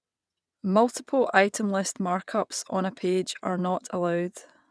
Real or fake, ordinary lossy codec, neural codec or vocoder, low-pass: fake; none; vocoder, 22.05 kHz, 80 mel bands, WaveNeXt; none